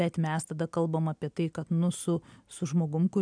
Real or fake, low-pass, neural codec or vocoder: real; 9.9 kHz; none